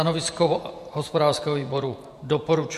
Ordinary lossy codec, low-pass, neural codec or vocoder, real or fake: MP3, 64 kbps; 14.4 kHz; vocoder, 48 kHz, 128 mel bands, Vocos; fake